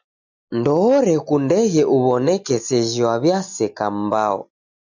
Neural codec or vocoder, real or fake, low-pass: none; real; 7.2 kHz